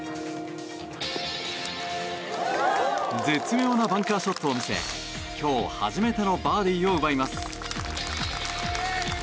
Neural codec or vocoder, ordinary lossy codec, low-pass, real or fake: none; none; none; real